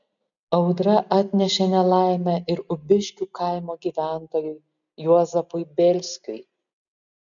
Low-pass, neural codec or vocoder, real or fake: 7.2 kHz; none; real